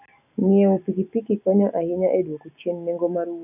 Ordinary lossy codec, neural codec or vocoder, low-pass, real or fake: none; none; 3.6 kHz; real